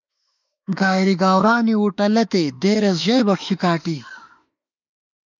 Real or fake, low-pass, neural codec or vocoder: fake; 7.2 kHz; autoencoder, 48 kHz, 32 numbers a frame, DAC-VAE, trained on Japanese speech